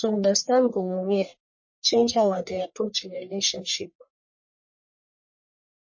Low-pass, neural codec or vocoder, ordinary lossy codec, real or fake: 7.2 kHz; codec, 44.1 kHz, 1.7 kbps, Pupu-Codec; MP3, 32 kbps; fake